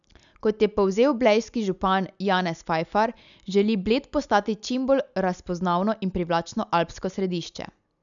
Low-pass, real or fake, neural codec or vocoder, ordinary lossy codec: 7.2 kHz; real; none; none